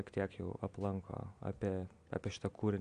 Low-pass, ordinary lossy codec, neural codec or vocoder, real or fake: 9.9 kHz; Opus, 64 kbps; none; real